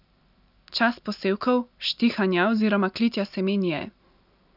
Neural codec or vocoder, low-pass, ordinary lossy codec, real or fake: none; 5.4 kHz; none; real